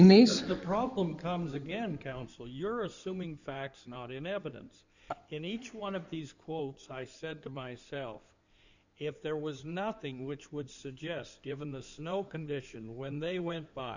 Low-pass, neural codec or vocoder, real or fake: 7.2 kHz; codec, 16 kHz in and 24 kHz out, 2.2 kbps, FireRedTTS-2 codec; fake